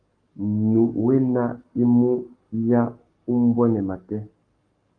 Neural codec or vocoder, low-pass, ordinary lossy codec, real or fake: codec, 44.1 kHz, 7.8 kbps, Pupu-Codec; 9.9 kHz; Opus, 32 kbps; fake